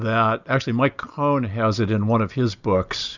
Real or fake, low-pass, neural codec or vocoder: real; 7.2 kHz; none